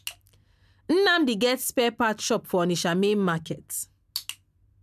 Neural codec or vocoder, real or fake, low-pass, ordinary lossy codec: none; real; 14.4 kHz; none